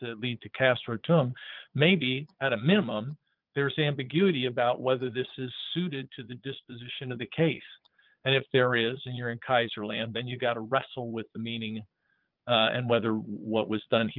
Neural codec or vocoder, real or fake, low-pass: vocoder, 22.05 kHz, 80 mel bands, Vocos; fake; 5.4 kHz